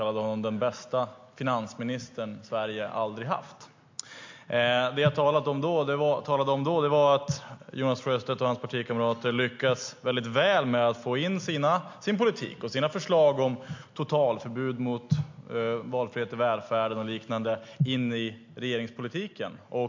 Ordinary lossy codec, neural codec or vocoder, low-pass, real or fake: MP3, 48 kbps; none; 7.2 kHz; real